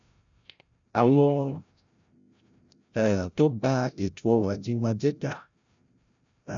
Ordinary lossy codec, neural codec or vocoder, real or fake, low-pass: none; codec, 16 kHz, 0.5 kbps, FreqCodec, larger model; fake; 7.2 kHz